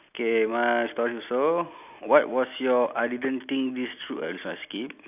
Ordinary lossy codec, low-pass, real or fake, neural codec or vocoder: none; 3.6 kHz; real; none